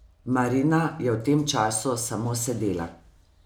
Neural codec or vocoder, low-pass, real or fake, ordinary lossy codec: none; none; real; none